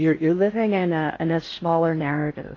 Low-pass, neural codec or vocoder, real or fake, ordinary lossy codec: 7.2 kHz; codec, 16 kHz in and 24 kHz out, 0.6 kbps, FocalCodec, streaming, 4096 codes; fake; AAC, 32 kbps